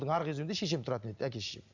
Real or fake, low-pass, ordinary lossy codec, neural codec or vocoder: real; 7.2 kHz; MP3, 64 kbps; none